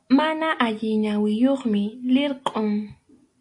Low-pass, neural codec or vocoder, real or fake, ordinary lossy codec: 10.8 kHz; none; real; AAC, 48 kbps